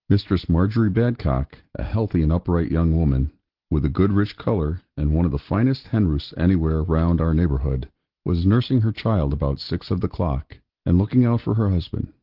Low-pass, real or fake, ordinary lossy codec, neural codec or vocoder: 5.4 kHz; real; Opus, 16 kbps; none